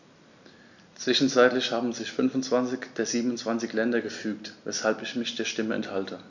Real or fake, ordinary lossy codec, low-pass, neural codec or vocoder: real; none; 7.2 kHz; none